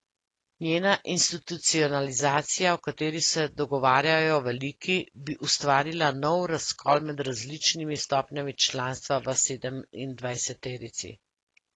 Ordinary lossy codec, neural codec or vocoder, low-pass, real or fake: AAC, 32 kbps; none; 10.8 kHz; real